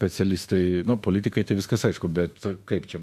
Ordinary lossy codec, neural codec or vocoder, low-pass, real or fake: AAC, 96 kbps; autoencoder, 48 kHz, 32 numbers a frame, DAC-VAE, trained on Japanese speech; 14.4 kHz; fake